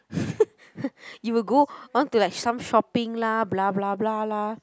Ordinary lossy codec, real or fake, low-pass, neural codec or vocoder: none; real; none; none